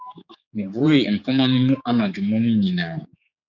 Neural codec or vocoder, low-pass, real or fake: codec, 16 kHz, 4 kbps, X-Codec, HuBERT features, trained on general audio; 7.2 kHz; fake